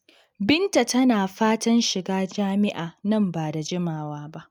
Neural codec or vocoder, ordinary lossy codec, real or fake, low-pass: none; none; real; 19.8 kHz